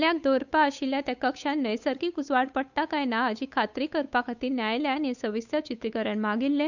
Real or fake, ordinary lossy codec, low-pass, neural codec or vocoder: fake; none; 7.2 kHz; codec, 16 kHz, 8 kbps, FunCodec, trained on Chinese and English, 25 frames a second